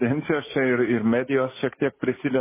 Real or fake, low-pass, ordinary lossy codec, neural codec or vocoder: real; 3.6 kHz; MP3, 16 kbps; none